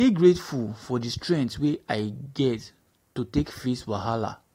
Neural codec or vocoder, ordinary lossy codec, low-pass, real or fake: none; AAC, 48 kbps; 19.8 kHz; real